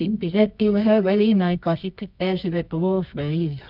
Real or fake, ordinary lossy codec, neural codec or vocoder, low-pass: fake; none; codec, 24 kHz, 0.9 kbps, WavTokenizer, medium music audio release; 5.4 kHz